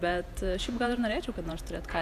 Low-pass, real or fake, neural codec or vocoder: 14.4 kHz; fake; vocoder, 48 kHz, 128 mel bands, Vocos